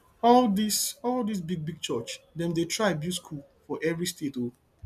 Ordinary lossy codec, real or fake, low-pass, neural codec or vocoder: none; real; 14.4 kHz; none